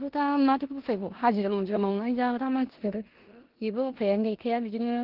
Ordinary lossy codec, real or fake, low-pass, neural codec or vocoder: Opus, 16 kbps; fake; 5.4 kHz; codec, 16 kHz in and 24 kHz out, 0.9 kbps, LongCat-Audio-Codec, four codebook decoder